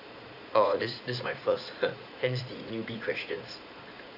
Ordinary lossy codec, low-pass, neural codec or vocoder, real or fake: none; 5.4 kHz; vocoder, 44.1 kHz, 80 mel bands, Vocos; fake